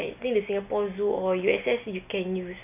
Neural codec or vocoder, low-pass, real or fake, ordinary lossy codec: none; 3.6 kHz; real; none